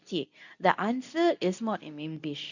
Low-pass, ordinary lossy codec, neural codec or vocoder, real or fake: 7.2 kHz; none; codec, 24 kHz, 0.9 kbps, WavTokenizer, medium speech release version 2; fake